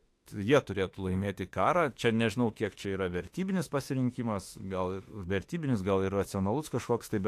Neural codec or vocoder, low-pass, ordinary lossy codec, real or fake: autoencoder, 48 kHz, 32 numbers a frame, DAC-VAE, trained on Japanese speech; 14.4 kHz; AAC, 64 kbps; fake